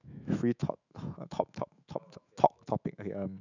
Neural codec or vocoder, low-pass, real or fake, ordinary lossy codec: autoencoder, 48 kHz, 128 numbers a frame, DAC-VAE, trained on Japanese speech; 7.2 kHz; fake; none